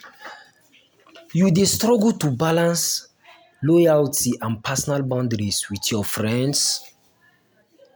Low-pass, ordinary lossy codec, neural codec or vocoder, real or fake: none; none; none; real